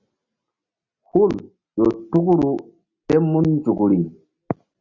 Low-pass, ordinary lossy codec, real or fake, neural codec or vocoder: 7.2 kHz; Opus, 64 kbps; real; none